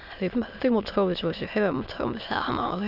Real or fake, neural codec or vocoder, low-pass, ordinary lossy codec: fake; autoencoder, 22.05 kHz, a latent of 192 numbers a frame, VITS, trained on many speakers; 5.4 kHz; none